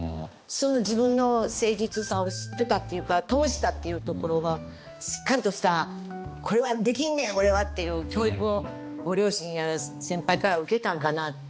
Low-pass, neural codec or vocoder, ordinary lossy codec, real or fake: none; codec, 16 kHz, 2 kbps, X-Codec, HuBERT features, trained on balanced general audio; none; fake